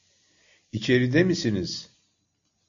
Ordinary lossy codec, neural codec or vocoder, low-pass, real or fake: AAC, 64 kbps; none; 7.2 kHz; real